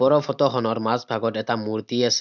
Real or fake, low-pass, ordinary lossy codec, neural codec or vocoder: real; 7.2 kHz; none; none